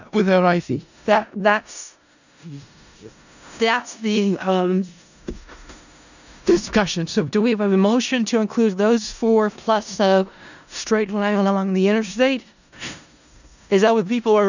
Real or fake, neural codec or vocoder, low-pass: fake; codec, 16 kHz in and 24 kHz out, 0.4 kbps, LongCat-Audio-Codec, four codebook decoder; 7.2 kHz